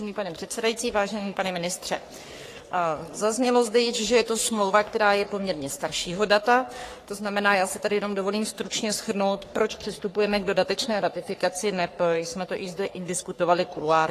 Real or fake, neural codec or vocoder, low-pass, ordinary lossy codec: fake; codec, 44.1 kHz, 3.4 kbps, Pupu-Codec; 14.4 kHz; AAC, 48 kbps